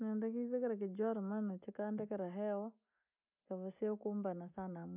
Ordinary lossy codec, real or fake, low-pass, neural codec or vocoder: none; real; 3.6 kHz; none